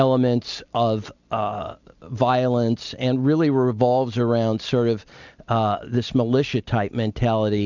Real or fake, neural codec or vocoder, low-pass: real; none; 7.2 kHz